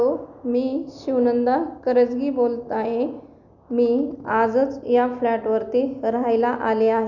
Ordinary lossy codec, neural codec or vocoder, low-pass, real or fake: none; none; 7.2 kHz; real